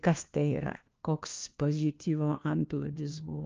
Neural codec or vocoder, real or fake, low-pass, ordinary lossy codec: codec, 16 kHz, 1 kbps, FunCodec, trained on LibriTTS, 50 frames a second; fake; 7.2 kHz; Opus, 24 kbps